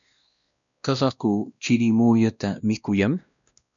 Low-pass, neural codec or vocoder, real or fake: 7.2 kHz; codec, 16 kHz, 1 kbps, X-Codec, WavLM features, trained on Multilingual LibriSpeech; fake